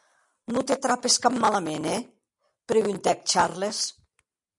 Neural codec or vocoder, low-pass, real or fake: none; 10.8 kHz; real